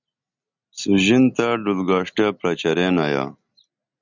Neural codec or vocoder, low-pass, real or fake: none; 7.2 kHz; real